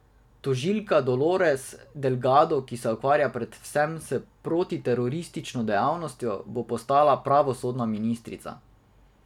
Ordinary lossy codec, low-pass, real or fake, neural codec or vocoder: none; 19.8 kHz; real; none